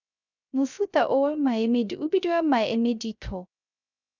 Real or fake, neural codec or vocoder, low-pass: fake; codec, 16 kHz, 0.3 kbps, FocalCodec; 7.2 kHz